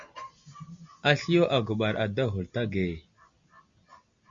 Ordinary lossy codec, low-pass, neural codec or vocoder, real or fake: Opus, 64 kbps; 7.2 kHz; none; real